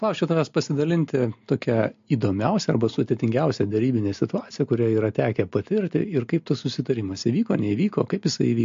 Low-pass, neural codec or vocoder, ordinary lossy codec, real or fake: 7.2 kHz; none; MP3, 48 kbps; real